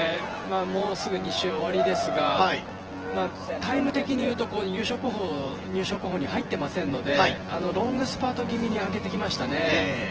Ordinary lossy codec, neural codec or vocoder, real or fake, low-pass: Opus, 16 kbps; vocoder, 24 kHz, 100 mel bands, Vocos; fake; 7.2 kHz